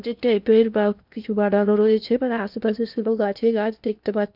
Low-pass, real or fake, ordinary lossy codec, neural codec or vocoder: 5.4 kHz; fake; none; codec, 16 kHz in and 24 kHz out, 0.8 kbps, FocalCodec, streaming, 65536 codes